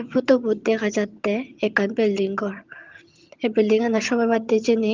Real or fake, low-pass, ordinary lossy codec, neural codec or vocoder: fake; 7.2 kHz; Opus, 32 kbps; vocoder, 22.05 kHz, 80 mel bands, HiFi-GAN